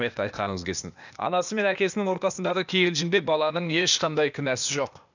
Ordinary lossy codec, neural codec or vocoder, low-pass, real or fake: none; codec, 16 kHz, 0.8 kbps, ZipCodec; 7.2 kHz; fake